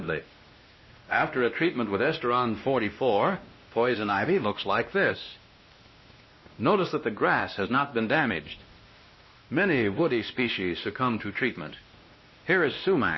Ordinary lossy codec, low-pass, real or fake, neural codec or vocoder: MP3, 24 kbps; 7.2 kHz; fake; codec, 16 kHz, 1 kbps, X-Codec, WavLM features, trained on Multilingual LibriSpeech